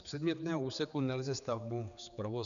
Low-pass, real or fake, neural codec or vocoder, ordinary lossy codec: 7.2 kHz; fake; codec, 16 kHz, 4 kbps, X-Codec, HuBERT features, trained on general audio; MP3, 96 kbps